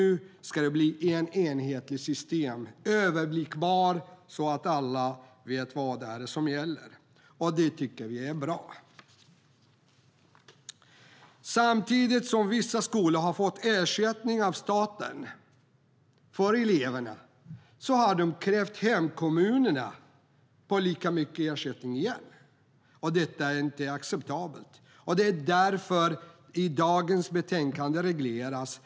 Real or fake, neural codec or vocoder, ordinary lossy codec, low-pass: real; none; none; none